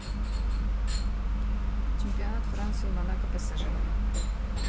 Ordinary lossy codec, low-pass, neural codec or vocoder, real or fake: none; none; none; real